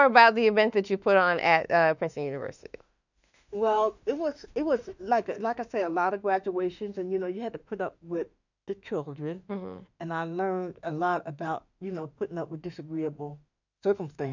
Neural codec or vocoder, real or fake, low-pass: autoencoder, 48 kHz, 32 numbers a frame, DAC-VAE, trained on Japanese speech; fake; 7.2 kHz